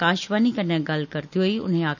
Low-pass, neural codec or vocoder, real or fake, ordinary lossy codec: 7.2 kHz; none; real; none